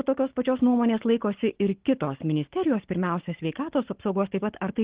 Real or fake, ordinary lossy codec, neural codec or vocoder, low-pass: fake; Opus, 16 kbps; codec, 44.1 kHz, 7.8 kbps, Pupu-Codec; 3.6 kHz